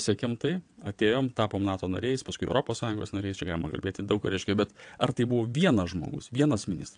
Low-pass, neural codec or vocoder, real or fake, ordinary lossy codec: 9.9 kHz; vocoder, 22.05 kHz, 80 mel bands, WaveNeXt; fake; AAC, 64 kbps